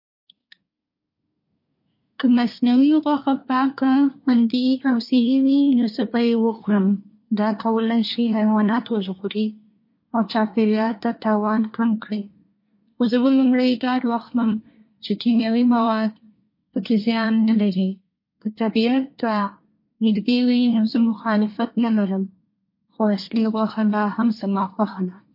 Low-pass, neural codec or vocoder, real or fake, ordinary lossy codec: 5.4 kHz; codec, 24 kHz, 1 kbps, SNAC; fake; MP3, 32 kbps